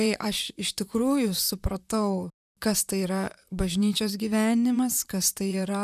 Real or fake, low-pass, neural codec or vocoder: fake; 14.4 kHz; vocoder, 44.1 kHz, 128 mel bands, Pupu-Vocoder